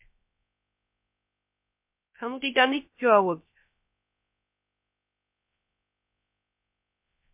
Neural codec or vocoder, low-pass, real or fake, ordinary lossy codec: codec, 16 kHz, 0.3 kbps, FocalCodec; 3.6 kHz; fake; MP3, 32 kbps